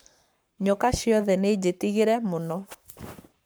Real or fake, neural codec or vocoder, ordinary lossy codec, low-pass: fake; codec, 44.1 kHz, 7.8 kbps, Pupu-Codec; none; none